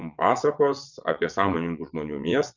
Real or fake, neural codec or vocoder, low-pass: fake; vocoder, 22.05 kHz, 80 mel bands, WaveNeXt; 7.2 kHz